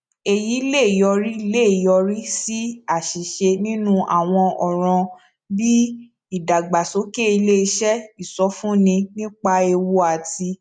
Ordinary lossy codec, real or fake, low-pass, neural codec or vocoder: none; real; 9.9 kHz; none